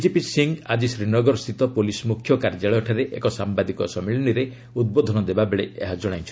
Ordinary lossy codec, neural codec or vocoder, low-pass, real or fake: none; none; none; real